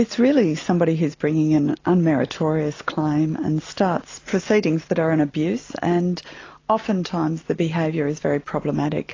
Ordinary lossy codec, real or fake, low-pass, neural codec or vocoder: AAC, 32 kbps; real; 7.2 kHz; none